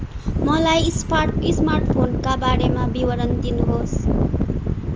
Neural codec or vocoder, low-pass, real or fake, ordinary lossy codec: none; 7.2 kHz; real; Opus, 24 kbps